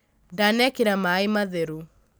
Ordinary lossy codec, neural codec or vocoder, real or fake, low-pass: none; none; real; none